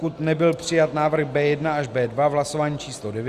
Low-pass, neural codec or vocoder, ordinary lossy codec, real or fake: 14.4 kHz; none; Opus, 64 kbps; real